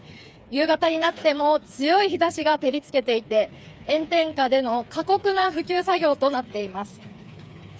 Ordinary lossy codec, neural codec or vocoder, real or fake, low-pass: none; codec, 16 kHz, 4 kbps, FreqCodec, smaller model; fake; none